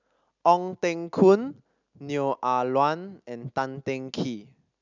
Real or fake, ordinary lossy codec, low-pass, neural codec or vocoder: real; none; 7.2 kHz; none